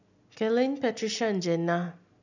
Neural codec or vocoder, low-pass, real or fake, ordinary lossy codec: none; 7.2 kHz; real; none